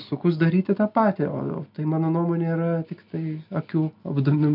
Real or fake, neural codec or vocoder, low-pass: real; none; 5.4 kHz